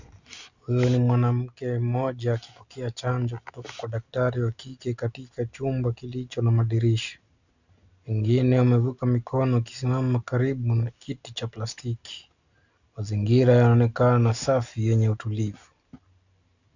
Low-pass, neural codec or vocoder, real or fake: 7.2 kHz; none; real